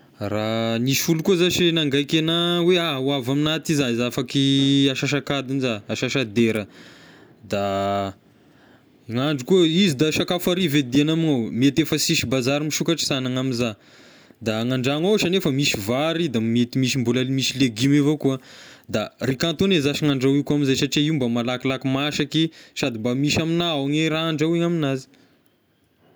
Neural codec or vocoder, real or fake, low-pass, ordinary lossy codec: none; real; none; none